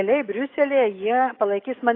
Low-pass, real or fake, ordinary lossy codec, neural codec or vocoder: 5.4 kHz; fake; AAC, 32 kbps; codec, 44.1 kHz, 7.8 kbps, DAC